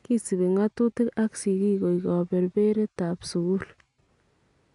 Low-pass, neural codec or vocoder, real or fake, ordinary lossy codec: 10.8 kHz; none; real; none